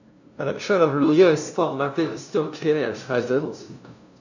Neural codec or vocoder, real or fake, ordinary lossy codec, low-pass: codec, 16 kHz, 0.5 kbps, FunCodec, trained on LibriTTS, 25 frames a second; fake; none; 7.2 kHz